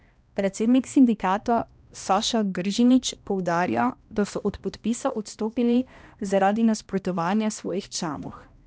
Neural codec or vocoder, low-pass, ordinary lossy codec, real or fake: codec, 16 kHz, 1 kbps, X-Codec, HuBERT features, trained on balanced general audio; none; none; fake